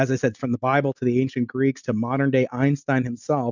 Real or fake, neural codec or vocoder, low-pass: real; none; 7.2 kHz